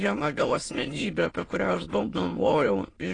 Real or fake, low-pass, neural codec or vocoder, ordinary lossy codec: fake; 9.9 kHz; autoencoder, 22.05 kHz, a latent of 192 numbers a frame, VITS, trained on many speakers; AAC, 32 kbps